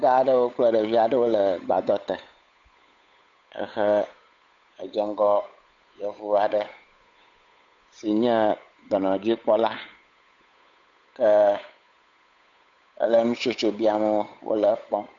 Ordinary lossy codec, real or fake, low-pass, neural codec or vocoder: MP3, 64 kbps; fake; 7.2 kHz; codec, 16 kHz, 8 kbps, FunCodec, trained on Chinese and English, 25 frames a second